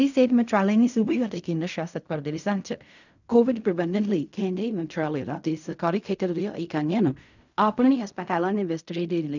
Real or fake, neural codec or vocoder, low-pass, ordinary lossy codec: fake; codec, 16 kHz in and 24 kHz out, 0.4 kbps, LongCat-Audio-Codec, fine tuned four codebook decoder; 7.2 kHz; none